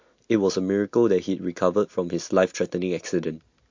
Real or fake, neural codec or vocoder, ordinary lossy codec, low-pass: real; none; MP3, 48 kbps; 7.2 kHz